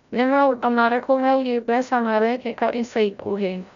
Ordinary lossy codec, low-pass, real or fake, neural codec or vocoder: none; 7.2 kHz; fake; codec, 16 kHz, 0.5 kbps, FreqCodec, larger model